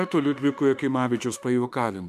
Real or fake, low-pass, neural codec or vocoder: fake; 14.4 kHz; autoencoder, 48 kHz, 32 numbers a frame, DAC-VAE, trained on Japanese speech